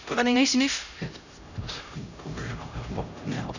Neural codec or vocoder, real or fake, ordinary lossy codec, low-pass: codec, 16 kHz, 0.5 kbps, X-Codec, HuBERT features, trained on LibriSpeech; fake; none; 7.2 kHz